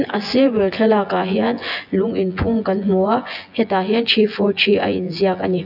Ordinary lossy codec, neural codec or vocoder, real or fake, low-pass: none; vocoder, 24 kHz, 100 mel bands, Vocos; fake; 5.4 kHz